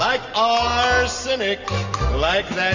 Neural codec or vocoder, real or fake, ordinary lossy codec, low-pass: none; real; MP3, 64 kbps; 7.2 kHz